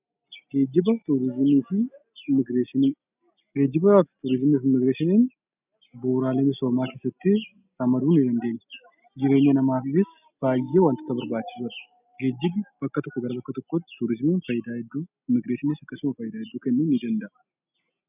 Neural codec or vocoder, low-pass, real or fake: none; 3.6 kHz; real